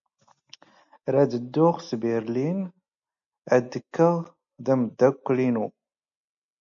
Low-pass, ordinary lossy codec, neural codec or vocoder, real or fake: 7.2 kHz; MP3, 64 kbps; none; real